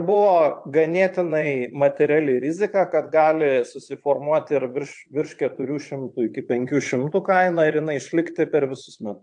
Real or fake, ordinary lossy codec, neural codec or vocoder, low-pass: fake; AAC, 64 kbps; vocoder, 22.05 kHz, 80 mel bands, Vocos; 9.9 kHz